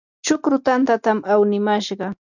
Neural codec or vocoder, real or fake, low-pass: none; real; 7.2 kHz